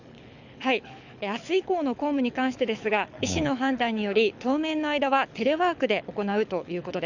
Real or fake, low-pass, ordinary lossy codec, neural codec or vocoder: fake; 7.2 kHz; none; codec, 24 kHz, 6 kbps, HILCodec